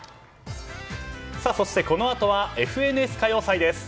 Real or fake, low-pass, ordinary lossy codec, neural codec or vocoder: real; none; none; none